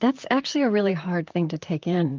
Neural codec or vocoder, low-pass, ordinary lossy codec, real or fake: vocoder, 44.1 kHz, 128 mel bands, Pupu-Vocoder; 7.2 kHz; Opus, 24 kbps; fake